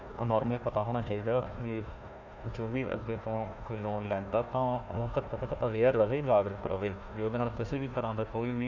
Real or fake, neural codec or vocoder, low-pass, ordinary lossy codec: fake; codec, 16 kHz, 1 kbps, FunCodec, trained on Chinese and English, 50 frames a second; 7.2 kHz; none